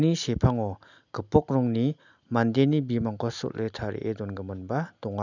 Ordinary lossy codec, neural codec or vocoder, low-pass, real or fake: none; none; 7.2 kHz; real